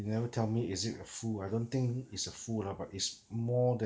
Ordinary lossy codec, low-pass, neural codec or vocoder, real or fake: none; none; none; real